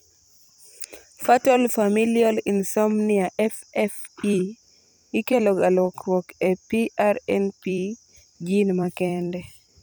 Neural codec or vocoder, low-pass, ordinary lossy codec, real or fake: vocoder, 44.1 kHz, 128 mel bands every 256 samples, BigVGAN v2; none; none; fake